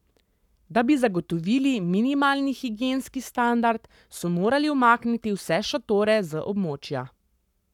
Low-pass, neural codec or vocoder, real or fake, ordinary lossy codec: 19.8 kHz; codec, 44.1 kHz, 7.8 kbps, Pupu-Codec; fake; none